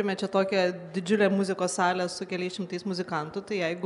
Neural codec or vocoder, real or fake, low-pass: none; real; 10.8 kHz